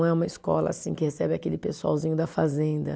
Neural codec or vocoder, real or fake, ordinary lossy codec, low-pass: none; real; none; none